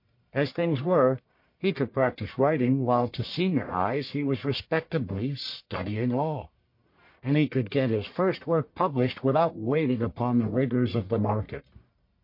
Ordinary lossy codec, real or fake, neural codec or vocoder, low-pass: MP3, 32 kbps; fake; codec, 44.1 kHz, 1.7 kbps, Pupu-Codec; 5.4 kHz